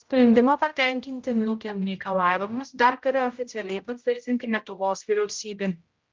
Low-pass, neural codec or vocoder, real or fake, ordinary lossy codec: 7.2 kHz; codec, 16 kHz, 0.5 kbps, X-Codec, HuBERT features, trained on general audio; fake; Opus, 24 kbps